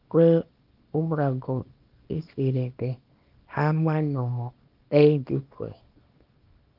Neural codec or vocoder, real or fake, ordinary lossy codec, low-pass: codec, 24 kHz, 0.9 kbps, WavTokenizer, small release; fake; Opus, 24 kbps; 5.4 kHz